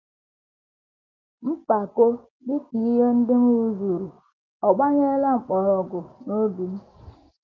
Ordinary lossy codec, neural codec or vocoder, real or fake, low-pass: Opus, 32 kbps; none; real; 7.2 kHz